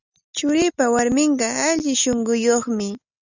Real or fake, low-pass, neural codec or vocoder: real; 7.2 kHz; none